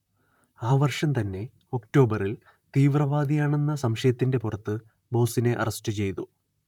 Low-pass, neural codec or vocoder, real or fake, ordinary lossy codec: 19.8 kHz; vocoder, 44.1 kHz, 128 mel bands, Pupu-Vocoder; fake; none